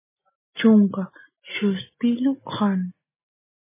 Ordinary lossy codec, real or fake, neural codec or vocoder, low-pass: MP3, 16 kbps; real; none; 3.6 kHz